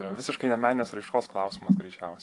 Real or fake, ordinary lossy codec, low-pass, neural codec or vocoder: fake; AAC, 48 kbps; 10.8 kHz; vocoder, 24 kHz, 100 mel bands, Vocos